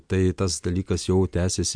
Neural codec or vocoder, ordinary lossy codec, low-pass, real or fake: none; MP3, 64 kbps; 9.9 kHz; real